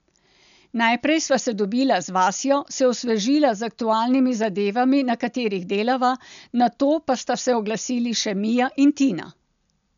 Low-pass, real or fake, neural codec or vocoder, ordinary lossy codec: 7.2 kHz; real; none; none